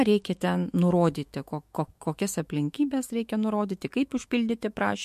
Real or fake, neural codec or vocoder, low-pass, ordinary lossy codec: fake; autoencoder, 48 kHz, 128 numbers a frame, DAC-VAE, trained on Japanese speech; 14.4 kHz; MP3, 64 kbps